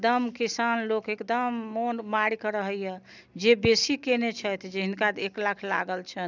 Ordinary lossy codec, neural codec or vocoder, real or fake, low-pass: none; none; real; 7.2 kHz